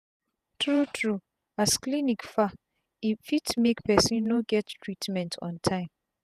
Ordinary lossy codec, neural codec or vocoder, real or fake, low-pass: none; vocoder, 48 kHz, 128 mel bands, Vocos; fake; 14.4 kHz